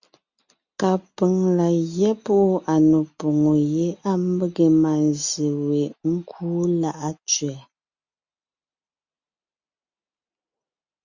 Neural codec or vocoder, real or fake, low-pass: none; real; 7.2 kHz